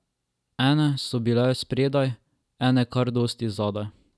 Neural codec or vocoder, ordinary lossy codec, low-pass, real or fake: none; none; none; real